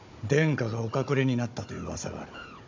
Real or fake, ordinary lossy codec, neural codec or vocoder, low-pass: fake; MP3, 64 kbps; codec, 16 kHz, 16 kbps, FunCodec, trained on Chinese and English, 50 frames a second; 7.2 kHz